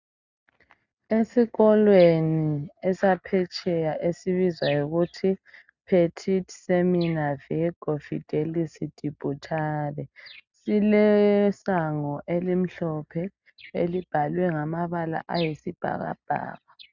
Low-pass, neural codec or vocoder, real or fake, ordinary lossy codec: 7.2 kHz; none; real; Opus, 64 kbps